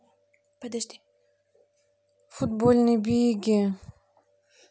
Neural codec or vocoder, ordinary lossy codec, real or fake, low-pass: none; none; real; none